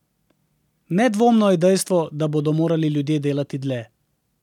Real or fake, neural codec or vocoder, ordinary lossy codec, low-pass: real; none; none; 19.8 kHz